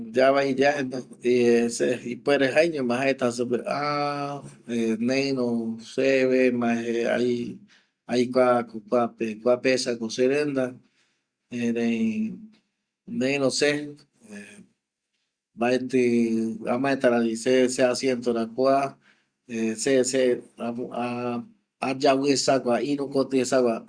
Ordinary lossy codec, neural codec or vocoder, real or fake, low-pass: Opus, 64 kbps; none; real; 9.9 kHz